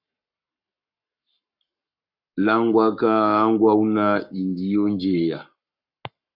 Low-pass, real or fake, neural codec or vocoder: 5.4 kHz; fake; codec, 44.1 kHz, 7.8 kbps, Pupu-Codec